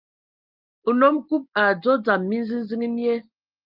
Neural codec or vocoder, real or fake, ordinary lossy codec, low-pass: none; real; Opus, 32 kbps; 5.4 kHz